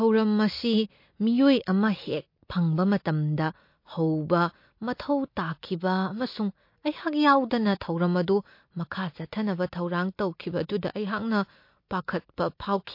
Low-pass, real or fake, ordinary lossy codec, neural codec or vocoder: 5.4 kHz; real; MP3, 32 kbps; none